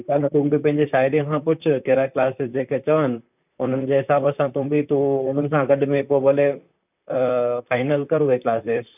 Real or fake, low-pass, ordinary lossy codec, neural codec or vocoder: fake; 3.6 kHz; none; vocoder, 44.1 kHz, 128 mel bands, Pupu-Vocoder